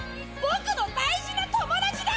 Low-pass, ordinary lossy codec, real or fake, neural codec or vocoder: none; none; real; none